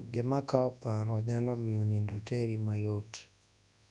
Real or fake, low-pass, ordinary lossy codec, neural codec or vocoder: fake; 10.8 kHz; none; codec, 24 kHz, 0.9 kbps, WavTokenizer, large speech release